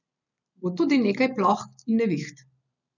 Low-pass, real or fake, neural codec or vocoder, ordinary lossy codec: 7.2 kHz; real; none; none